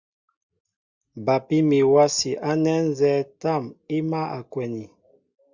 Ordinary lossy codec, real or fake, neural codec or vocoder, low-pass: Opus, 64 kbps; real; none; 7.2 kHz